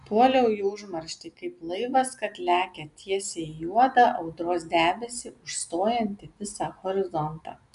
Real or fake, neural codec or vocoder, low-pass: real; none; 10.8 kHz